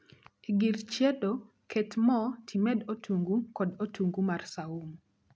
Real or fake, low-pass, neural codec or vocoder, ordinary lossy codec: real; none; none; none